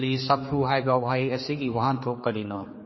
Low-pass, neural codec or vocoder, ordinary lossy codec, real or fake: 7.2 kHz; codec, 16 kHz, 4 kbps, X-Codec, HuBERT features, trained on general audio; MP3, 24 kbps; fake